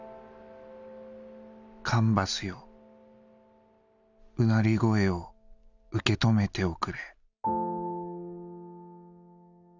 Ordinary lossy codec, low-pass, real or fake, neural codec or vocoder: none; 7.2 kHz; real; none